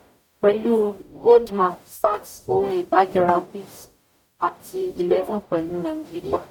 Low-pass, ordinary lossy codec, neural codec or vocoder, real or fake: 19.8 kHz; none; codec, 44.1 kHz, 0.9 kbps, DAC; fake